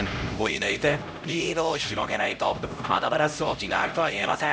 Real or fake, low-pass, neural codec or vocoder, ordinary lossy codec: fake; none; codec, 16 kHz, 0.5 kbps, X-Codec, HuBERT features, trained on LibriSpeech; none